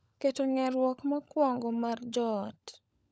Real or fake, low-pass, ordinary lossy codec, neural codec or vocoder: fake; none; none; codec, 16 kHz, 16 kbps, FunCodec, trained on LibriTTS, 50 frames a second